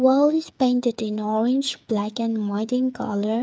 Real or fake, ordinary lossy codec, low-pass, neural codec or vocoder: fake; none; none; codec, 16 kHz, 8 kbps, FreqCodec, smaller model